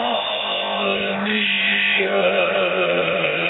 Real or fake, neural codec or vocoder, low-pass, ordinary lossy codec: fake; codec, 16 kHz, 0.8 kbps, ZipCodec; 7.2 kHz; AAC, 16 kbps